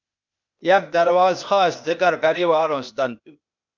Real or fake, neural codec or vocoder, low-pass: fake; codec, 16 kHz, 0.8 kbps, ZipCodec; 7.2 kHz